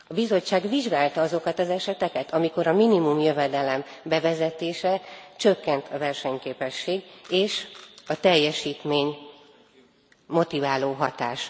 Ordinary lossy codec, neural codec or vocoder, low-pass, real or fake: none; none; none; real